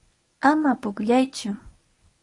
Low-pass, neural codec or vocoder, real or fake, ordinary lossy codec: 10.8 kHz; codec, 24 kHz, 0.9 kbps, WavTokenizer, medium speech release version 1; fake; AAC, 48 kbps